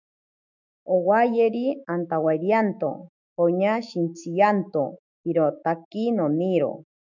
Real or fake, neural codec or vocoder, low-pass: fake; autoencoder, 48 kHz, 128 numbers a frame, DAC-VAE, trained on Japanese speech; 7.2 kHz